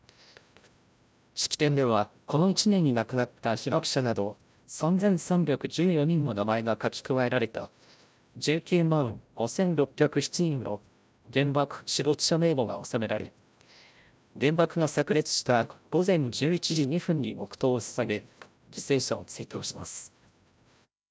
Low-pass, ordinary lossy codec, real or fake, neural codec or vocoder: none; none; fake; codec, 16 kHz, 0.5 kbps, FreqCodec, larger model